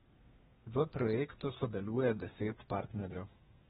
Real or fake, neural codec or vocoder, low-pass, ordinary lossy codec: fake; codec, 32 kHz, 1.9 kbps, SNAC; 14.4 kHz; AAC, 16 kbps